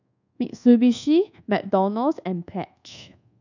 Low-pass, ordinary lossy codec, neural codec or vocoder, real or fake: 7.2 kHz; none; codec, 24 kHz, 1.2 kbps, DualCodec; fake